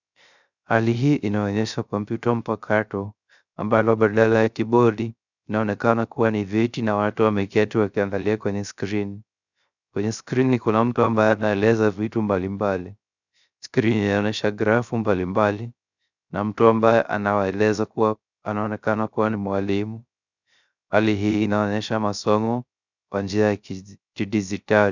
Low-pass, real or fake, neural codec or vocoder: 7.2 kHz; fake; codec, 16 kHz, 0.3 kbps, FocalCodec